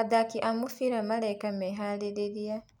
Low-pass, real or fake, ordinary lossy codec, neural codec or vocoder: 19.8 kHz; real; none; none